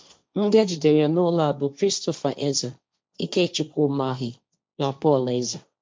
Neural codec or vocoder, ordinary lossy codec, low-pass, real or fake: codec, 16 kHz, 1.1 kbps, Voila-Tokenizer; none; none; fake